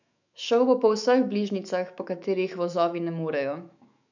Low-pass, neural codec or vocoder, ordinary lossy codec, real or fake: 7.2 kHz; autoencoder, 48 kHz, 128 numbers a frame, DAC-VAE, trained on Japanese speech; none; fake